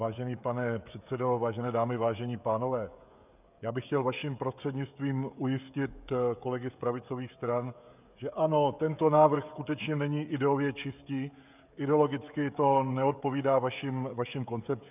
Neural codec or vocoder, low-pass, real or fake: codec, 16 kHz, 16 kbps, FreqCodec, smaller model; 3.6 kHz; fake